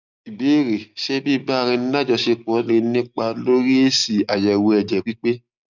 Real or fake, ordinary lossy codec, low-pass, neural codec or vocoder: real; none; 7.2 kHz; none